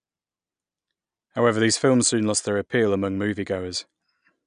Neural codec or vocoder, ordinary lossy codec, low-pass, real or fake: none; none; 9.9 kHz; real